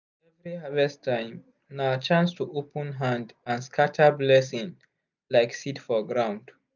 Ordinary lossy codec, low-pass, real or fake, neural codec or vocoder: none; 7.2 kHz; real; none